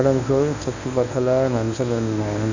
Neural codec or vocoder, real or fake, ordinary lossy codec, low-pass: codec, 24 kHz, 0.9 kbps, WavTokenizer, medium speech release version 1; fake; none; 7.2 kHz